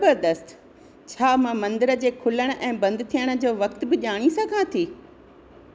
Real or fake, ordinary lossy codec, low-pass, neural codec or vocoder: real; none; none; none